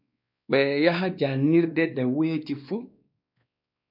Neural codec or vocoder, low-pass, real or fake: codec, 16 kHz, 2 kbps, X-Codec, WavLM features, trained on Multilingual LibriSpeech; 5.4 kHz; fake